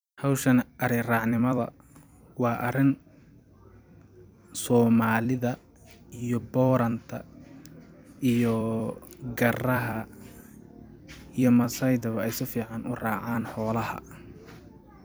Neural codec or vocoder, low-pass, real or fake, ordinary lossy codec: vocoder, 44.1 kHz, 128 mel bands every 256 samples, BigVGAN v2; none; fake; none